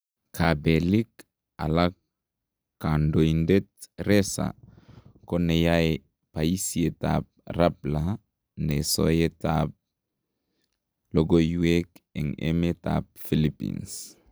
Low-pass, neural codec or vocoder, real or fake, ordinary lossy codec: none; none; real; none